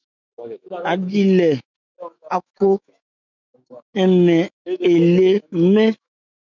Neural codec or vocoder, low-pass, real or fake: autoencoder, 48 kHz, 128 numbers a frame, DAC-VAE, trained on Japanese speech; 7.2 kHz; fake